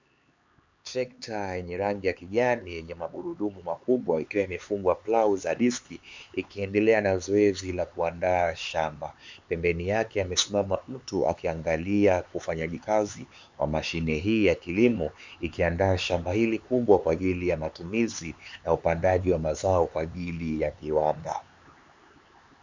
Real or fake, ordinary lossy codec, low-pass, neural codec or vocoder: fake; MP3, 64 kbps; 7.2 kHz; codec, 16 kHz, 4 kbps, X-Codec, HuBERT features, trained on LibriSpeech